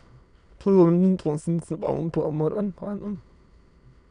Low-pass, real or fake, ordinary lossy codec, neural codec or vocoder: 9.9 kHz; fake; none; autoencoder, 22.05 kHz, a latent of 192 numbers a frame, VITS, trained on many speakers